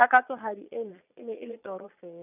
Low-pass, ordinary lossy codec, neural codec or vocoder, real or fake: 3.6 kHz; none; vocoder, 22.05 kHz, 80 mel bands, Vocos; fake